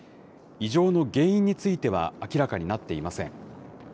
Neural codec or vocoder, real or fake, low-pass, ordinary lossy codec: none; real; none; none